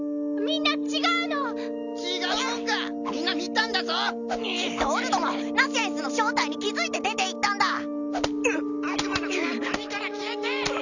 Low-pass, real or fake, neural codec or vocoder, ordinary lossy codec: 7.2 kHz; real; none; none